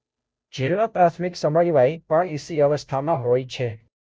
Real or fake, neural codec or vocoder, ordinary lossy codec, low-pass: fake; codec, 16 kHz, 0.5 kbps, FunCodec, trained on Chinese and English, 25 frames a second; none; none